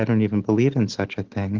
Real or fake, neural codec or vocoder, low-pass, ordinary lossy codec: real; none; 7.2 kHz; Opus, 16 kbps